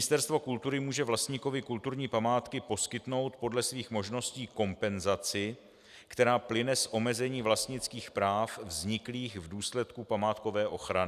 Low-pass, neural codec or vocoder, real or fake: 14.4 kHz; none; real